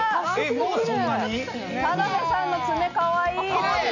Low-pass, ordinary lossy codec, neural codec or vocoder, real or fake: 7.2 kHz; none; none; real